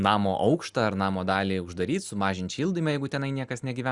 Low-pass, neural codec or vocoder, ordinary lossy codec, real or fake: 10.8 kHz; none; Opus, 64 kbps; real